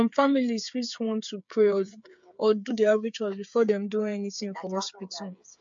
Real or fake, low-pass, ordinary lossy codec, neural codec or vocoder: fake; 7.2 kHz; MP3, 48 kbps; codec, 16 kHz, 8 kbps, FreqCodec, smaller model